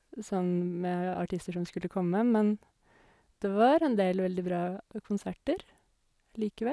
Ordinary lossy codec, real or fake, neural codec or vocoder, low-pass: none; real; none; none